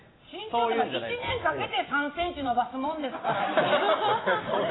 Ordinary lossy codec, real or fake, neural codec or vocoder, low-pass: AAC, 16 kbps; fake; vocoder, 44.1 kHz, 128 mel bands every 256 samples, BigVGAN v2; 7.2 kHz